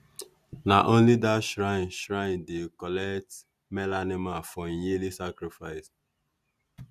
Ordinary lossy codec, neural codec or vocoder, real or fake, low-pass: none; none; real; 14.4 kHz